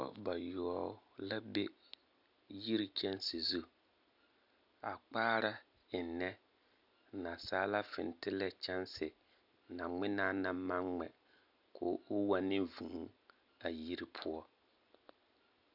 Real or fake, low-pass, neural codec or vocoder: real; 5.4 kHz; none